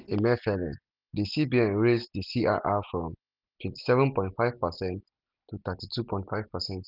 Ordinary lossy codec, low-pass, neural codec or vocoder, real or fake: none; 5.4 kHz; none; real